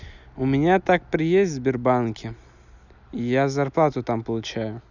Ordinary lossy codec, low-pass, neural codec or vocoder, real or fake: none; 7.2 kHz; none; real